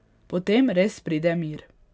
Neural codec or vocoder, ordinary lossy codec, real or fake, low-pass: none; none; real; none